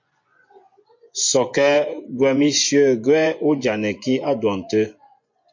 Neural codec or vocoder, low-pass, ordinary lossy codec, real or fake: vocoder, 24 kHz, 100 mel bands, Vocos; 7.2 kHz; MP3, 48 kbps; fake